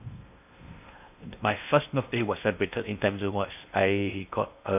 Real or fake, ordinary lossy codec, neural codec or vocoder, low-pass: fake; AAC, 32 kbps; codec, 16 kHz in and 24 kHz out, 0.6 kbps, FocalCodec, streaming, 2048 codes; 3.6 kHz